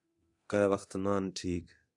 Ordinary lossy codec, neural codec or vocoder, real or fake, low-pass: AAC, 64 kbps; codec, 24 kHz, 0.9 kbps, DualCodec; fake; 10.8 kHz